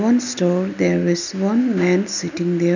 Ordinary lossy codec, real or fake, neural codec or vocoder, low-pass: none; real; none; 7.2 kHz